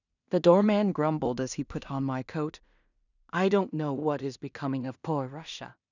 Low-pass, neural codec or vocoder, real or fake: 7.2 kHz; codec, 16 kHz in and 24 kHz out, 0.4 kbps, LongCat-Audio-Codec, two codebook decoder; fake